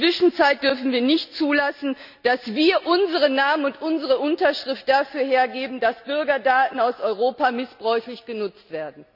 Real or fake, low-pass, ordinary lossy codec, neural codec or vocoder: real; 5.4 kHz; none; none